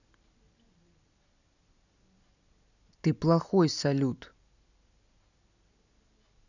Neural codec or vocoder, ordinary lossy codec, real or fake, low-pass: none; none; real; 7.2 kHz